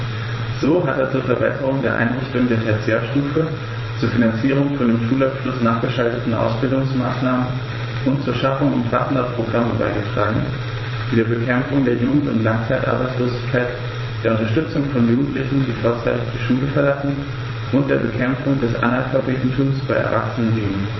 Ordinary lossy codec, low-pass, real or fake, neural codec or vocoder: MP3, 24 kbps; 7.2 kHz; fake; vocoder, 22.05 kHz, 80 mel bands, WaveNeXt